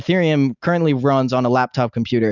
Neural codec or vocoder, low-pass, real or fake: none; 7.2 kHz; real